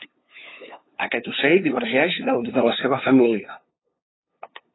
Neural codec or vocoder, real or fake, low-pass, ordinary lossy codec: codec, 16 kHz, 2 kbps, FunCodec, trained on LibriTTS, 25 frames a second; fake; 7.2 kHz; AAC, 16 kbps